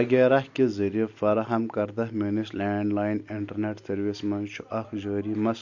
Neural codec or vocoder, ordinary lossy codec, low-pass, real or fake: none; AAC, 48 kbps; 7.2 kHz; real